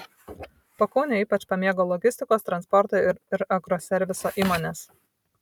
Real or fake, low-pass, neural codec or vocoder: real; 19.8 kHz; none